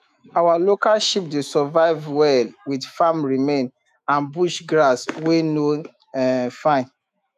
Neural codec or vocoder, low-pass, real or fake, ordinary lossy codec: autoencoder, 48 kHz, 128 numbers a frame, DAC-VAE, trained on Japanese speech; 14.4 kHz; fake; none